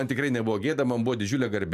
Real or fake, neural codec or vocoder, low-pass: real; none; 14.4 kHz